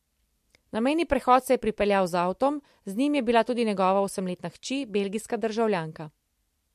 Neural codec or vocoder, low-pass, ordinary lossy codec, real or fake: none; 14.4 kHz; MP3, 64 kbps; real